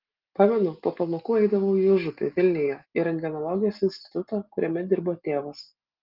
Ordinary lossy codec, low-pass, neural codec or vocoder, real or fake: Opus, 32 kbps; 5.4 kHz; none; real